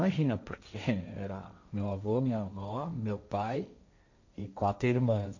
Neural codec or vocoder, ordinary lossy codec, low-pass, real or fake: codec, 16 kHz, 1.1 kbps, Voila-Tokenizer; none; 7.2 kHz; fake